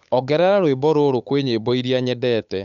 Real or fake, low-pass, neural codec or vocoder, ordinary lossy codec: fake; 7.2 kHz; codec, 16 kHz, 8 kbps, FunCodec, trained on Chinese and English, 25 frames a second; none